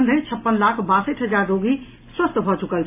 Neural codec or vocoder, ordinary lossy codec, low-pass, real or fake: none; AAC, 24 kbps; 3.6 kHz; real